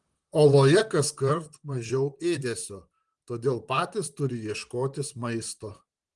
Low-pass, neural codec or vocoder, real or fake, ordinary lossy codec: 10.8 kHz; vocoder, 44.1 kHz, 128 mel bands, Pupu-Vocoder; fake; Opus, 24 kbps